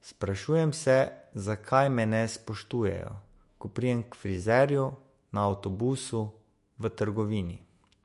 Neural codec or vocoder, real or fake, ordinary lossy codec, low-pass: autoencoder, 48 kHz, 128 numbers a frame, DAC-VAE, trained on Japanese speech; fake; MP3, 48 kbps; 14.4 kHz